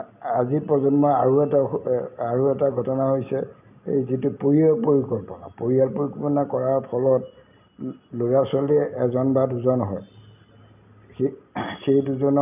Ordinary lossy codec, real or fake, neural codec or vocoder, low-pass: none; real; none; 3.6 kHz